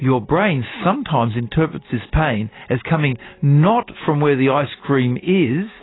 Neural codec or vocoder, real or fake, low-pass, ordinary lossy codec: none; real; 7.2 kHz; AAC, 16 kbps